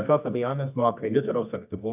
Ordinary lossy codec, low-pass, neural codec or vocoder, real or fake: AAC, 32 kbps; 3.6 kHz; codec, 24 kHz, 0.9 kbps, WavTokenizer, medium music audio release; fake